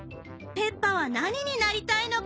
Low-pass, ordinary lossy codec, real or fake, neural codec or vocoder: none; none; real; none